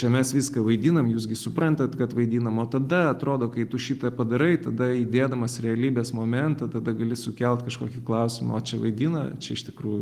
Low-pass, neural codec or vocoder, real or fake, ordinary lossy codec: 14.4 kHz; none; real; Opus, 24 kbps